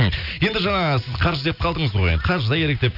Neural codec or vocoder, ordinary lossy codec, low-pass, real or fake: none; none; 5.4 kHz; real